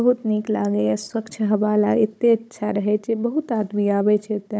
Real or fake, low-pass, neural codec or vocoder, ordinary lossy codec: fake; none; codec, 16 kHz, 16 kbps, FunCodec, trained on Chinese and English, 50 frames a second; none